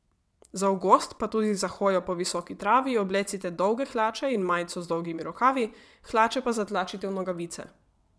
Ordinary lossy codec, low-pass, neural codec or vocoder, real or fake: none; none; vocoder, 22.05 kHz, 80 mel bands, WaveNeXt; fake